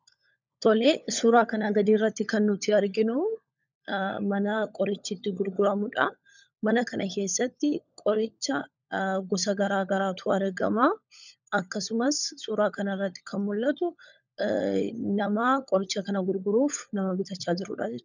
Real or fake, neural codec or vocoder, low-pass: fake; codec, 16 kHz, 16 kbps, FunCodec, trained on LibriTTS, 50 frames a second; 7.2 kHz